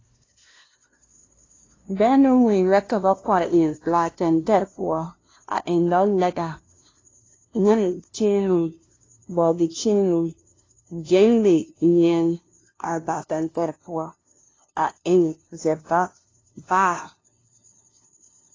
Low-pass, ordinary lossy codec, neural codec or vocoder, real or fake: 7.2 kHz; AAC, 32 kbps; codec, 16 kHz, 0.5 kbps, FunCodec, trained on LibriTTS, 25 frames a second; fake